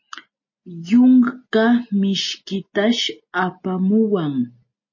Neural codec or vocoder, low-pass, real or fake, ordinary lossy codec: none; 7.2 kHz; real; MP3, 32 kbps